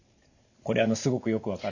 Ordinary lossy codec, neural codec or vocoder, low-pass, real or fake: none; none; 7.2 kHz; real